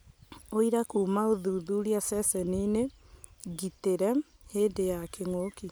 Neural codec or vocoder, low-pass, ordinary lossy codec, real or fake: none; none; none; real